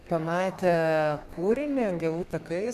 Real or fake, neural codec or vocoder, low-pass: fake; codec, 32 kHz, 1.9 kbps, SNAC; 14.4 kHz